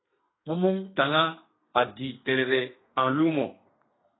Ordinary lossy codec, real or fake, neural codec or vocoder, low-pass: AAC, 16 kbps; fake; codec, 32 kHz, 1.9 kbps, SNAC; 7.2 kHz